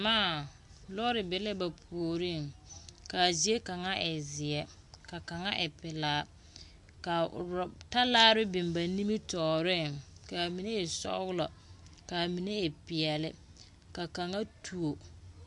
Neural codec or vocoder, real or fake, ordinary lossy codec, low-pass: none; real; MP3, 64 kbps; 10.8 kHz